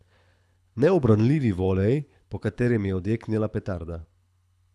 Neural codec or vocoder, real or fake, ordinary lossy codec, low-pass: codec, 24 kHz, 6 kbps, HILCodec; fake; none; none